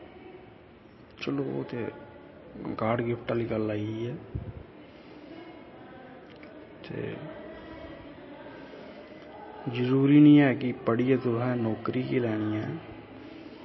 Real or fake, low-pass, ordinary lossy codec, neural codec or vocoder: real; 7.2 kHz; MP3, 24 kbps; none